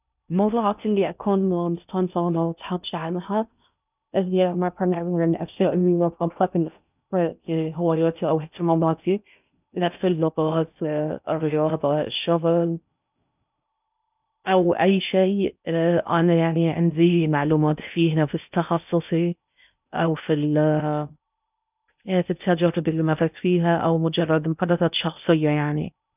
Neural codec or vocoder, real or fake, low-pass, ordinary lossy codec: codec, 16 kHz in and 24 kHz out, 0.6 kbps, FocalCodec, streaming, 2048 codes; fake; 3.6 kHz; none